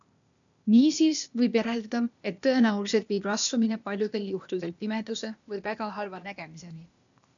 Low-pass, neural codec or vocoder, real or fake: 7.2 kHz; codec, 16 kHz, 0.8 kbps, ZipCodec; fake